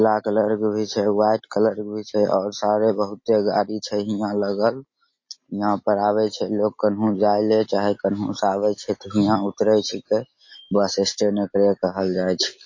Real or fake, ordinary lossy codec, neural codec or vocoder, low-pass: real; MP3, 32 kbps; none; 7.2 kHz